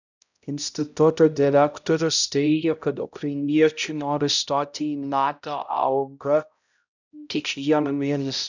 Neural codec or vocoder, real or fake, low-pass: codec, 16 kHz, 0.5 kbps, X-Codec, HuBERT features, trained on balanced general audio; fake; 7.2 kHz